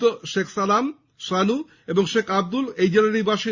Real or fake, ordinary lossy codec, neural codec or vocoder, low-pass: real; Opus, 64 kbps; none; 7.2 kHz